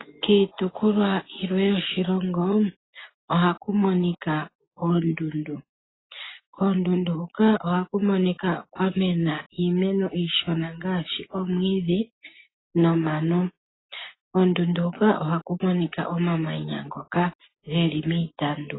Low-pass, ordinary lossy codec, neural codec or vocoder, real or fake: 7.2 kHz; AAC, 16 kbps; none; real